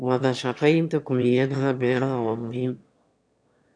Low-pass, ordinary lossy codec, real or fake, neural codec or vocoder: 9.9 kHz; none; fake; autoencoder, 22.05 kHz, a latent of 192 numbers a frame, VITS, trained on one speaker